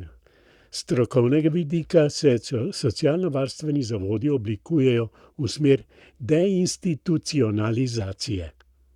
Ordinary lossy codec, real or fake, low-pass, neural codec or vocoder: none; fake; 19.8 kHz; codec, 44.1 kHz, 7.8 kbps, Pupu-Codec